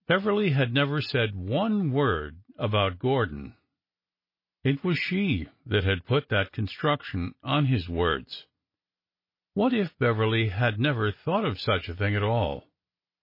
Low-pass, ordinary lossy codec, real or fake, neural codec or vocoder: 5.4 kHz; MP3, 24 kbps; real; none